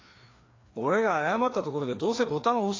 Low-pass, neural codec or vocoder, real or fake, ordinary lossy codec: 7.2 kHz; codec, 16 kHz, 2 kbps, FreqCodec, larger model; fake; AAC, 32 kbps